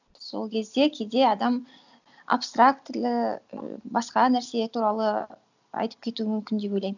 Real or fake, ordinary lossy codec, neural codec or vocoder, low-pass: real; none; none; 7.2 kHz